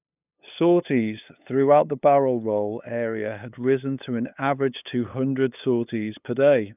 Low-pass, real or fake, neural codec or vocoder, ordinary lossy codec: 3.6 kHz; fake; codec, 16 kHz, 8 kbps, FunCodec, trained on LibriTTS, 25 frames a second; none